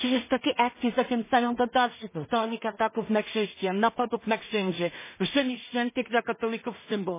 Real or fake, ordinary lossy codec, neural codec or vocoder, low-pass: fake; MP3, 16 kbps; codec, 16 kHz in and 24 kHz out, 0.4 kbps, LongCat-Audio-Codec, two codebook decoder; 3.6 kHz